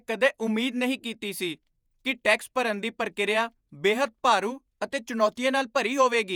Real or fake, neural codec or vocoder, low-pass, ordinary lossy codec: fake; vocoder, 48 kHz, 128 mel bands, Vocos; none; none